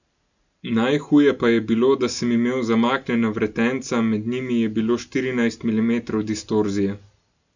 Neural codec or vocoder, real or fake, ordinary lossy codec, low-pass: none; real; none; 7.2 kHz